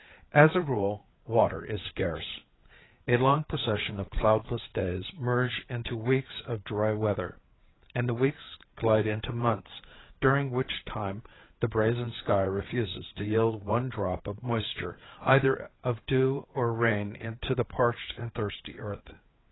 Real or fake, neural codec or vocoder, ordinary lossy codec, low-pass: fake; codec, 16 kHz, 8 kbps, FreqCodec, larger model; AAC, 16 kbps; 7.2 kHz